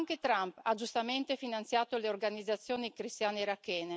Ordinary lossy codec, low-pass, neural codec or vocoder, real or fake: none; none; none; real